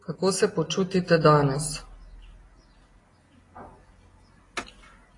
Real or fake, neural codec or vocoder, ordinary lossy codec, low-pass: real; none; AAC, 32 kbps; 10.8 kHz